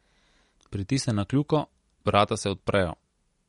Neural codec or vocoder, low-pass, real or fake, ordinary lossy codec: none; 19.8 kHz; real; MP3, 48 kbps